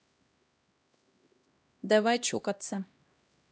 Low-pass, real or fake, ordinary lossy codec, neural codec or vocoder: none; fake; none; codec, 16 kHz, 1 kbps, X-Codec, HuBERT features, trained on LibriSpeech